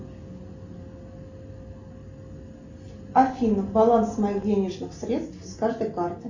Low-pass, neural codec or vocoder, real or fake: 7.2 kHz; none; real